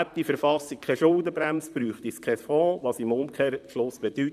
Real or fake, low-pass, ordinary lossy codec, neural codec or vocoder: fake; 14.4 kHz; none; vocoder, 44.1 kHz, 128 mel bands, Pupu-Vocoder